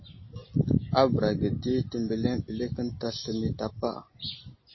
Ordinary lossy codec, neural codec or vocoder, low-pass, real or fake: MP3, 24 kbps; none; 7.2 kHz; real